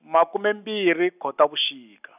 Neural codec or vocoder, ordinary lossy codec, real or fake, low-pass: none; none; real; 3.6 kHz